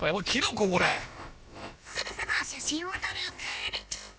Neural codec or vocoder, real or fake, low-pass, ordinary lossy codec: codec, 16 kHz, about 1 kbps, DyCAST, with the encoder's durations; fake; none; none